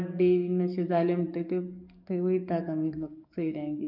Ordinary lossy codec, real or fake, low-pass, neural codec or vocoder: none; fake; 5.4 kHz; codec, 44.1 kHz, 7.8 kbps, DAC